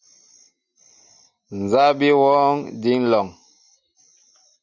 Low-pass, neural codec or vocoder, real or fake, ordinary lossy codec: 7.2 kHz; none; real; Opus, 64 kbps